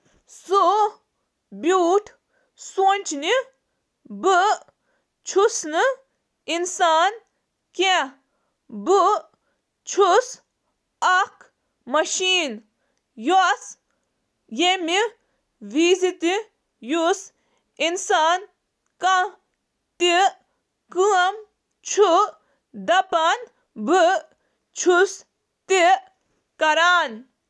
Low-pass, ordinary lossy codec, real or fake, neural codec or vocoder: none; none; real; none